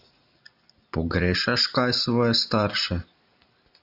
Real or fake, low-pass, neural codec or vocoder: real; 5.4 kHz; none